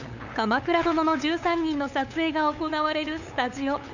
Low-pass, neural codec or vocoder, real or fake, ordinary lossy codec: 7.2 kHz; codec, 16 kHz, 8 kbps, FunCodec, trained on LibriTTS, 25 frames a second; fake; none